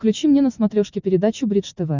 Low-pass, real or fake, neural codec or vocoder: 7.2 kHz; real; none